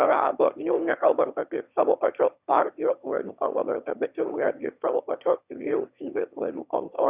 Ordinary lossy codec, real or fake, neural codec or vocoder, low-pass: Opus, 64 kbps; fake; autoencoder, 22.05 kHz, a latent of 192 numbers a frame, VITS, trained on one speaker; 3.6 kHz